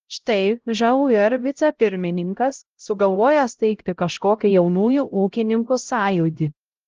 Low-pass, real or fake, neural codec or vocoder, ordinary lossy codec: 7.2 kHz; fake; codec, 16 kHz, 0.5 kbps, X-Codec, HuBERT features, trained on LibriSpeech; Opus, 16 kbps